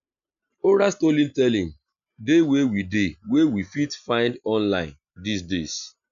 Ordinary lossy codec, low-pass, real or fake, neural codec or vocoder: AAC, 96 kbps; 7.2 kHz; real; none